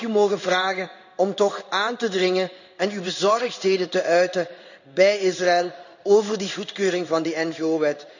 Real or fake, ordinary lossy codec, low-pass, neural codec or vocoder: fake; none; 7.2 kHz; codec, 16 kHz in and 24 kHz out, 1 kbps, XY-Tokenizer